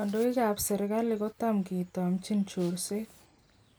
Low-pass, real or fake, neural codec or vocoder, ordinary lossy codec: none; real; none; none